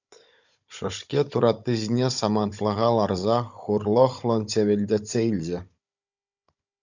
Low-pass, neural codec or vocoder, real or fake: 7.2 kHz; codec, 16 kHz, 16 kbps, FunCodec, trained on Chinese and English, 50 frames a second; fake